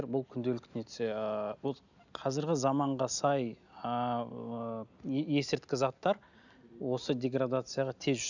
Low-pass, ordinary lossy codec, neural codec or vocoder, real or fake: 7.2 kHz; none; none; real